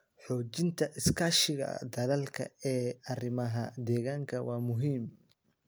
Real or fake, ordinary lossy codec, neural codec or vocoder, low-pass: real; none; none; none